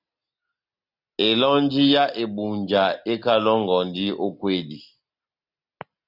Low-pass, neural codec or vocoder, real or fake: 5.4 kHz; none; real